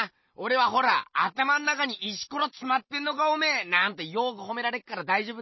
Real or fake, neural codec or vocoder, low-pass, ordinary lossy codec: real; none; 7.2 kHz; MP3, 24 kbps